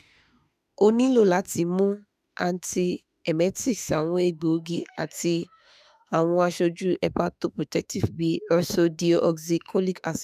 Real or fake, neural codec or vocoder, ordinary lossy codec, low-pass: fake; autoencoder, 48 kHz, 32 numbers a frame, DAC-VAE, trained on Japanese speech; none; 14.4 kHz